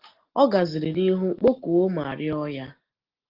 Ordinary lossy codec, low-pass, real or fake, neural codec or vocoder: Opus, 16 kbps; 5.4 kHz; real; none